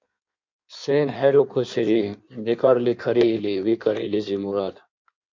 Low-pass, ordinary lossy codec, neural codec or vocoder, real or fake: 7.2 kHz; MP3, 48 kbps; codec, 16 kHz in and 24 kHz out, 1.1 kbps, FireRedTTS-2 codec; fake